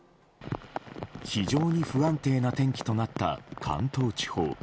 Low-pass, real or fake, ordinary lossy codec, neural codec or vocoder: none; real; none; none